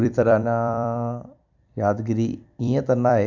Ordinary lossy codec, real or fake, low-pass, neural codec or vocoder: none; fake; 7.2 kHz; vocoder, 44.1 kHz, 128 mel bands every 256 samples, BigVGAN v2